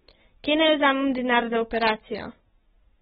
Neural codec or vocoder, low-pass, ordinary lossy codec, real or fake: none; 7.2 kHz; AAC, 16 kbps; real